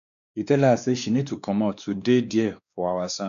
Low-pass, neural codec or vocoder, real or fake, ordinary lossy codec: 7.2 kHz; codec, 16 kHz, 2 kbps, X-Codec, WavLM features, trained on Multilingual LibriSpeech; fake; none